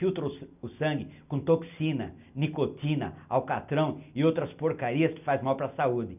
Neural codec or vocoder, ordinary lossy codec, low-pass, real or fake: none; none; 3.6 kHz; real